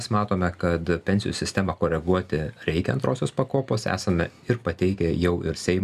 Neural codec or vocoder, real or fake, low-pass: none; real; 14.4 kHz